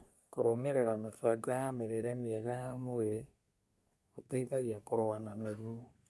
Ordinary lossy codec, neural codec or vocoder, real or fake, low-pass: none; codec, 24 kHz, 1 kbps, SNAC; fake; none